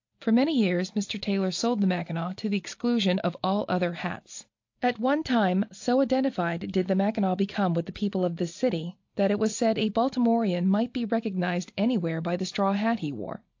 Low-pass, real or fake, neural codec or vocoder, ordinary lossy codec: 7.2 kHz; real; none; AAC, 48 kbps